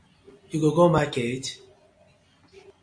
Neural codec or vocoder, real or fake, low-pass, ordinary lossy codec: none; real; 9.9 kHz; MP3, 48 kbps